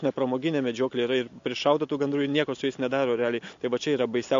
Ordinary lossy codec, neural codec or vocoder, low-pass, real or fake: MP3, 48 kbps; none; 7.2 kHz; real